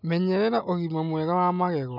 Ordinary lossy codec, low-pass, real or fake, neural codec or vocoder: none; 5.4 kHz; real; none